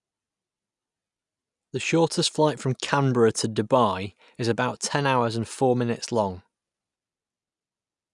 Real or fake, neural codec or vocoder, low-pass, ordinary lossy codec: real; none; 10.8 kHz; none